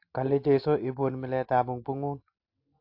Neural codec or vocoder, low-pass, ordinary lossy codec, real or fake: none; 5.4 kHz; AAC, 32 kbps; real